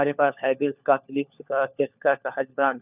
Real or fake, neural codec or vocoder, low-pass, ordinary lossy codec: fake; codec, 16 kHz, 4 kbps, FunCodec, trained on LibriTTS, 50 frames a second; 3.6 kHz; none